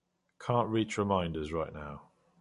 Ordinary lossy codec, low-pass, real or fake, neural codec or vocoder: MP3, 48 kbps; 14.4 kHz; real; none